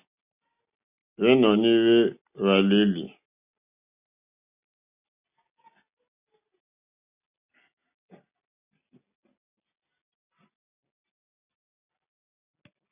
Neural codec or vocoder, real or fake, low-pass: none; real; 3.6 kHz